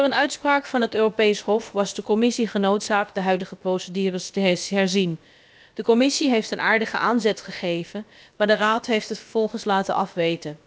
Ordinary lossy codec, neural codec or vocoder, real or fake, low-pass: none; codec, 16 kHz, about 1 kbps, DyCAST, with the encoder's durations; fake; none